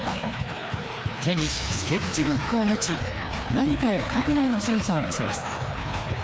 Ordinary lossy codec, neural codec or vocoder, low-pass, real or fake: none; codec, 16 kHz, 2 kbps, FreqCodec, larger model; none; fake